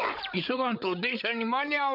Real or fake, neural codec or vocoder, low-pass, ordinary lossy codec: fake; codec, 16 kHz, 8 kbps, FreqCodec, larger model; 5.4 kHz; none